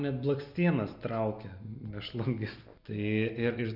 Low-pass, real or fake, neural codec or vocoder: 5.4 kHz; real; none